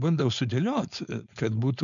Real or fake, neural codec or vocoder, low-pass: fake; codec, 16 kHz, 4.8 kbps, FACodec; 7.2 kHz